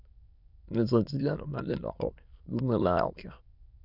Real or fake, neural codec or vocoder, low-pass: fake; autoencoder, 22.05 kHz, a latent of 192 numbers a frame, VITS, trained on many speakers; 5.4 kHz